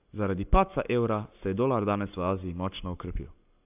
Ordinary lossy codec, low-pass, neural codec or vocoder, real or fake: AAC, 32 kbps; 3.6 kHz; codec, 44.1 kHz, 7.8 kbps, Pupu-Codec; fake